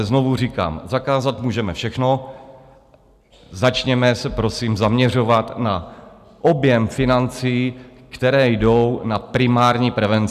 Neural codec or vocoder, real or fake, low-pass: none; real; 14.4 kHz